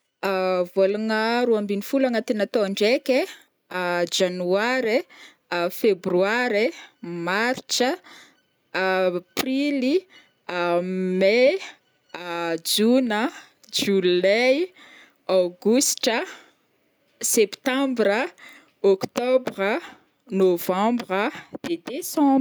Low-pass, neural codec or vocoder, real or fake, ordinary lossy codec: none; none; real; none